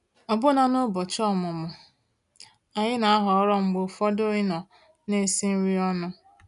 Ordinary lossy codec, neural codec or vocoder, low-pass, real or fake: none; none; 10.8 kHz; real